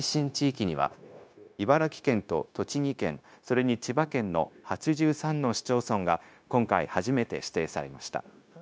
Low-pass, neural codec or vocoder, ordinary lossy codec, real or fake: none; codec, 16 kHz, 0.9 kbps, LongCat-Audio-Codec; none; fake